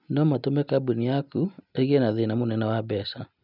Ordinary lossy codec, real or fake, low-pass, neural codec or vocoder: none; real; 5.4 kHz; none